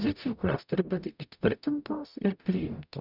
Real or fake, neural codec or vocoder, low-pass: fake; codec, 44.1 kHz, 0.9 kbps, DAC; 5.4 kHz